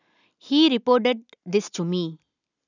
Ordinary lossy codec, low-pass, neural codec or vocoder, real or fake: none; 7.2 kHz; none; real